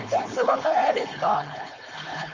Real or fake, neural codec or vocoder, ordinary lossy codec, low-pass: fake; codec, 16 kHz, 4.8 kbps, FACodec; Opus, 32 kbps; 7.2 kHz